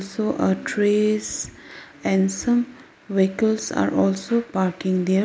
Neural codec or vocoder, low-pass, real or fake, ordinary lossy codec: none; none; real; none